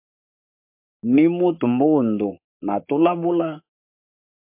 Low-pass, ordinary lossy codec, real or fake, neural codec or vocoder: 3.6 kHz; MP3, 32 kbps; fake; codec, 44.1 kHz, 7.8 kbps, Pupu-Codec